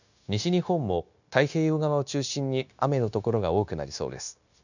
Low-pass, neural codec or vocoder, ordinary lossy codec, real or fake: 7.2 kHz; codec, 16 kHz, 0.9 kbps, LongCat-Audio-Codec; none; fake